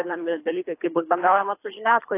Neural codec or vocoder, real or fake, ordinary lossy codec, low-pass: codec, 24 kHz, 3 kbps, HILCodec; fake; AAC, 24 kbps; 3.6 kHz